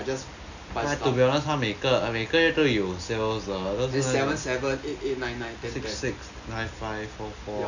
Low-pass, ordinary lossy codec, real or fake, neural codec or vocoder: 7.2 kHz; none; real; none